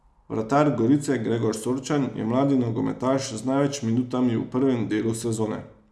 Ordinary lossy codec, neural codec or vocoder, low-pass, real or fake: none; none; none; real